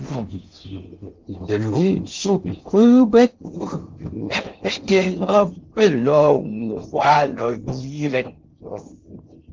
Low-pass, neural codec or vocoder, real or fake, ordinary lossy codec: 7.2 kHz; codec, 16 kHz in and 24 kHz out, 0.6 kbps, FocalCodec, streaming, 4096 codes; fake; Opus, 24 kbps